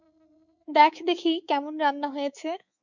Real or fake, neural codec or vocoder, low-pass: fake; codec, 24 kHz, 3.1 kbps, DualCodec; 7.2 kHz